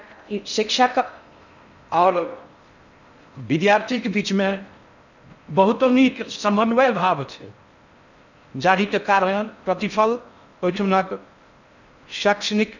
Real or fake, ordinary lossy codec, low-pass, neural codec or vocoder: fake; none; 7.2 kHz; codec, 16 kHz in and 24 kHz out, 0.6 kbps, FocalCodec, streaming, 4096 codes